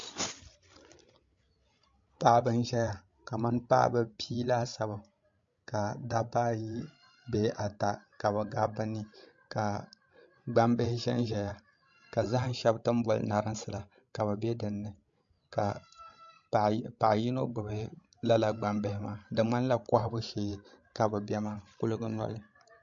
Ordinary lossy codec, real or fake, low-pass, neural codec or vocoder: MP3, 48 kbps; fake; 7.2 kHz; codec, 16 kHz, 16 kbps, FreqCodec, larger model